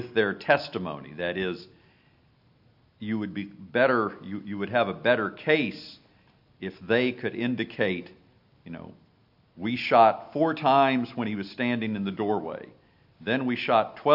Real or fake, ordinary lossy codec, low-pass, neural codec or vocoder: real; AAC, 48 kbps; 5.4 kHz; none